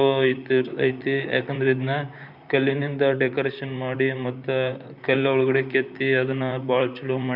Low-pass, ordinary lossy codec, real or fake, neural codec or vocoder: 5.4 kHz; none; fake; vocoder, 44.1 kHz, 128 mel bands, Pupu-Vocoder